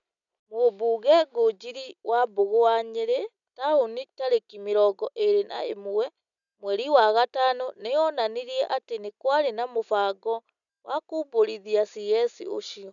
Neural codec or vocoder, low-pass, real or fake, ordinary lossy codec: none; 7.2 kHz; real; none